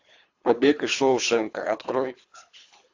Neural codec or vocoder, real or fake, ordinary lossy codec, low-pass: codec, 24 kHz, 3 kbps, HILCodec; fake; AAC, 48 kbps; 7.2 kHz